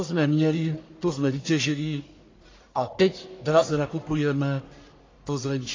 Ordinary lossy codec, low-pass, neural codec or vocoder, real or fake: AAC, 32 kbps; 7.2 kHz; codec, 44.1 kHz, 1.7 kbps, Pupu-Codec; fake